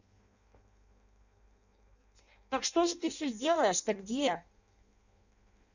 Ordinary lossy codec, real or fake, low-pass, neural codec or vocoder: none; fake; 7.2 kHz; codec, 16 kHz in and 24 kHz out, 0.6 kbps, FireRedTTS-2 codec